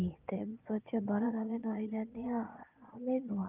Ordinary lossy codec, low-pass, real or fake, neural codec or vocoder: Opus, 32 kbps; 3.6 kHz; fake; vocoder, 22.05 kHz, 80 mel bands, Vocos